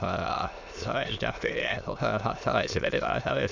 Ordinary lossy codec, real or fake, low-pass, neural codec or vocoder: MP3, 64 kbps; fake; 7.2 kHz; autoencoder, 22.05 kHz, a latent of 192 numbers a frame, VITS, trained on many speakers